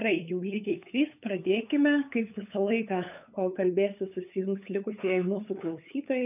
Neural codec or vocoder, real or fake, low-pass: codec, 16 kHz, 8 kbps, FunCodec, trained on LibriTTS, 25 frames a second; fake; 3.6 kHz